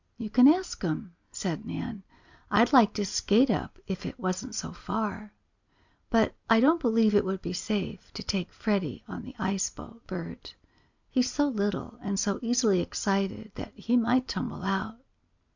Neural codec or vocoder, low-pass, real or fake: none; 7.2 kHz; real